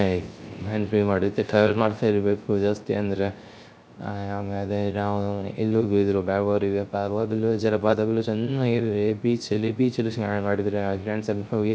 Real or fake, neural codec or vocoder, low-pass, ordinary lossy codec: fake; codec, 16 kHz, 0.3 kbps, FocalCodec; none; none